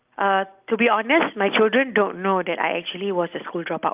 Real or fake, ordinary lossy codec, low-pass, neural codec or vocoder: real; Opus, 24 kbps; 3.6 kHz; none